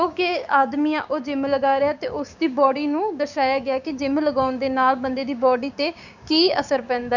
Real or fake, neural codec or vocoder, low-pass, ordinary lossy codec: fake; codec, 16 kHz, 6 kbps, DAC; 7.2 kHz; none